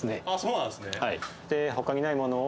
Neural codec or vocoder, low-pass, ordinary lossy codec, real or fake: none; none; none; real